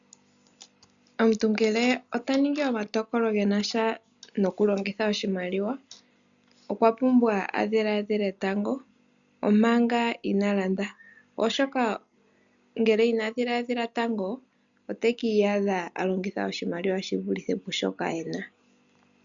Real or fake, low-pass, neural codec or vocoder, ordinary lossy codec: real; 7.2 kHz; none; MP3, 96 kbps